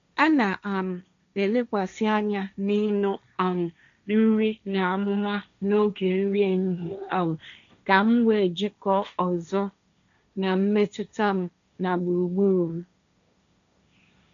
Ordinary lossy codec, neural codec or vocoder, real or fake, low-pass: AAC, 96 kbps; codec, 16 kHz, 1.1 kbps, Voila-Tokenizer; fake; 7.2 kHz